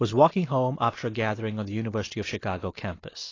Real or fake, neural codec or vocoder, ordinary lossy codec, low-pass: real; none; AAC, 32 kbps; 7.2 kHz